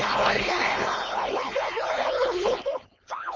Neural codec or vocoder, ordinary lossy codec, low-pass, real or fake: codec, 16 kHz, 4.8 kbps, FACodec; Opus, 32 kbps; 7.2 kHz; fake